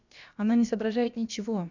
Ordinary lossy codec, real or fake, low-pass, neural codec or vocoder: none; fake; 7.2 kHz; codec, 16 kHz, about 1 kbps, DyCAST, with the encoder's durations